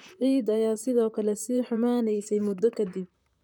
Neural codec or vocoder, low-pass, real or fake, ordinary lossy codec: vocoder, 44.1 kHz, 128 mel bands, Pupu-Vocoder; 19.8 kHz; fake; none